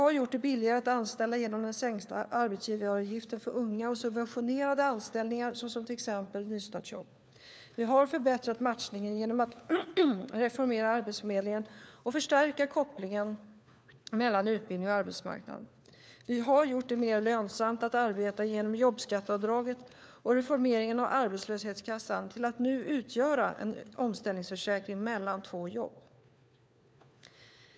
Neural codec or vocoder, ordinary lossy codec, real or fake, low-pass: codec, 16 kHz, 4 kbps, FunCodec, trained on LibriTTS, 50 frames a second; none; fake; none